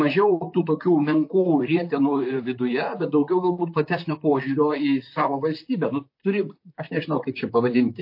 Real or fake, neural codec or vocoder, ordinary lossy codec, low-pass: fake; vocoder, 44.1 kHz, 128 mel bands, Pupu-Vocoder; MP3, 32 kbps; 5.4 kHz